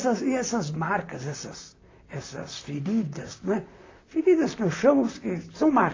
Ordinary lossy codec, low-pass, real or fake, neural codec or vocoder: AAC, 32 kbps; 7.2 kHz; fake; vocoder, 44.1 kHz, 128 mel bands, Pupu-Vocoder